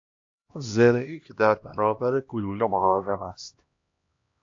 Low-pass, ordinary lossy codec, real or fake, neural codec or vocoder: 7.2 kHz; AAC, 48 kbps; fake; codec, 16 kHz, 1 kbps, X-Codec, HuBERT features, trained on LibriSpeech